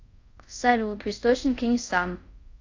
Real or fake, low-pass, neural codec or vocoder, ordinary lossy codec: fake; 7.2 kHz; codec, 24 kHz, 0.5 kbps, DualCodec; AAC, 48 kbps